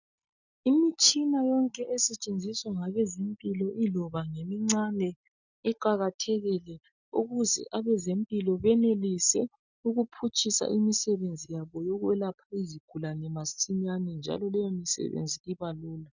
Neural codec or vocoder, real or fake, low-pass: none; real; 7.2 kHz